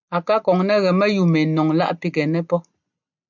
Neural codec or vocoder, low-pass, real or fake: none; 7.2 kHz; real